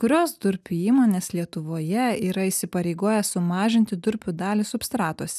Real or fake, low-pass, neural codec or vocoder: real; 14.4 kHz; none